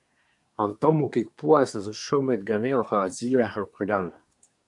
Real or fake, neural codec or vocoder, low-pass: fake; codec, 24 kHz, 1 kbps, SNAC; 10.8 kHz